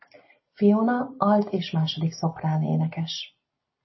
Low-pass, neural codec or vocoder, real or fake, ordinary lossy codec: 7.2 kHz; none; real; MP3, 24 kbps